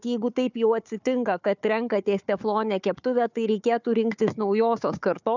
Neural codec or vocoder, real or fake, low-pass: codec, 16 kHz, 4 kbps, FunCodec, trained on Chinese and English, 50 frames a second; fake; 7.2 kHz